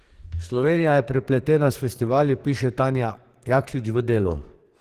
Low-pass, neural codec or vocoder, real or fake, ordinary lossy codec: 14.4 kHz; codec, 44.1 kHz, 2.6 kbps, SNAC; fake; Opus, 16 kbps